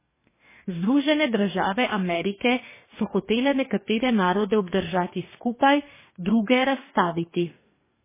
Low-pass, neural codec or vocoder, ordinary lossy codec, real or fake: 3.6 kHz; codec, 44.1 kHz, 2.6 kbps, DAC; MP3, 16 kbps; fake